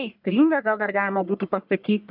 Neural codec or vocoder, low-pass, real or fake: codec, 44.1 kHz, 1.7 kbps, Pupu-Codec; 5.4 kHz; fake